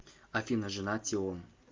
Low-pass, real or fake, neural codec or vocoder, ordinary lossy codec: 7.2 kHz; real; none; Opus, 24 kbps